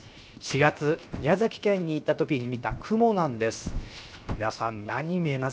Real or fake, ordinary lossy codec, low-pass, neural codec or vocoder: fake; none; none; codec, 16 kHz, 0.7 kbps, FocalCodec